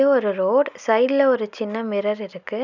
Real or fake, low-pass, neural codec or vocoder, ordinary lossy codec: real; 7.2 kHz; none; none